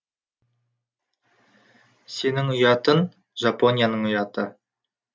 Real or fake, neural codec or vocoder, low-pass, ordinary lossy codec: real; none; none; none